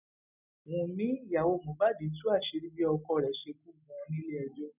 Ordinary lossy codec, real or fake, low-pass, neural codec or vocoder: none; real; 3.6 kHz; none